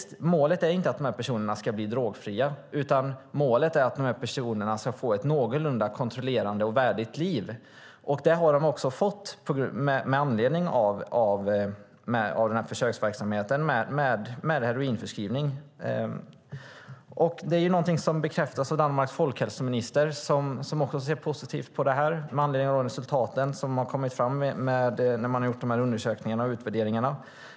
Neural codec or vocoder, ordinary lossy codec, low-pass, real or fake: none; none; none; real